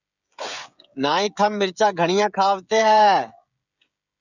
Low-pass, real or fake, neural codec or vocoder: 7.2 kHz; fake; codec, 16 kHz, 16 kbps, FreqCodec, smaller model